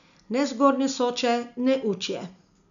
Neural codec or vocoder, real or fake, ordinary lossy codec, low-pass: none; real; none; 7.2 kHz